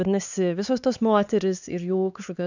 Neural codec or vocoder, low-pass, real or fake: codec, 16 kHz, 4 kbps, X-Codec, HuBERT features, trained on LibriSpeech; 7.2 kHz; fake